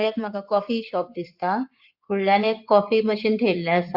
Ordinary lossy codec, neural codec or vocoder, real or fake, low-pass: Opus, 64 kbps; codec, 16 kHz in and 24 kHz out, 2.2 kbps, FireRedTTS-2 codec; fake; 5.4 kHz